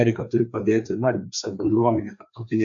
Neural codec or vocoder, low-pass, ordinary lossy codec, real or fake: codec, 16 kHz, 2 kbps, FreqCodec, larger model; 7.2 kHz; MP3, 96 kbps; fake